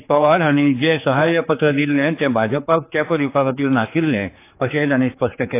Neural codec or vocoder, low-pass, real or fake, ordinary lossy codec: codec, 16 kHz, 4 kbps, X-Codec, HuBERT features, trained on general audio; 3.6 kHz; fake; AAC, 24 kbps